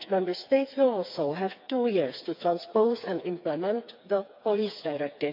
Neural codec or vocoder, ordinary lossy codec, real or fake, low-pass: codec, 16 kHz, 4 kbps, FreqCodec, smaller model; MP3, 48 kbps; fake; 5.4 kHz